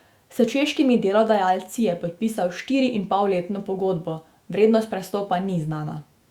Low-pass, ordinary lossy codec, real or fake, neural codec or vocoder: 19.8 kHz; Opus, 64 kbps; fake; autoencoder, 48 kHz, 128 numbers a frame, DAC-VAE, trained on Japanese speech